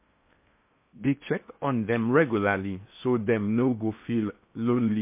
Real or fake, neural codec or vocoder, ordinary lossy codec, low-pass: fake; codec, 16 kHz in and 24 kHz out, 0.6 kbps, FocalCodec, streaming, 4096 codes; MP3, 24 kbps; 3.6 kHz